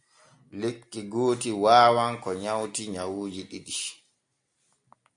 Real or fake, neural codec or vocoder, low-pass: real; none; 9.9 kHz